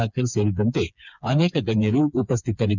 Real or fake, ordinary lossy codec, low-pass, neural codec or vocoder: fake; none; 7.2 kHz; codec, 16 kHz, 4 kbps, FreqCodec, smaller model